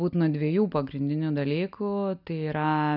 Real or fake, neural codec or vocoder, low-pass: real; none; 5.4 kHz